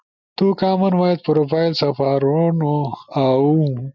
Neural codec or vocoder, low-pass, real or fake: none; 7.2 kHz; real